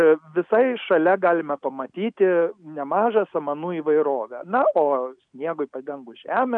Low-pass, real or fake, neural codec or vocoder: 9.9 kHz; real; none